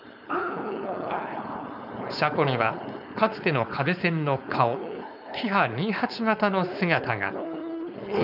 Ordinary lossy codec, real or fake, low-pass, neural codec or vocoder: none; fake; 5.4 kHz; codec, 16 kHz, 4.8 kbps, FACodec